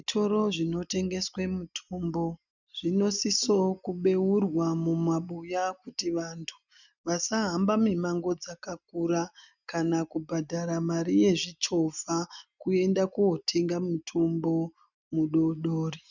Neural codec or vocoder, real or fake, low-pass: none; real; 7.2 kHz